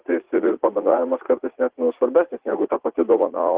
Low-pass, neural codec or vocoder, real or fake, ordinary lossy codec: 3.6 kHz; vocoder, 44.1 kHz, 80 mel bands, Vocos; fake; Opus, 16 kbps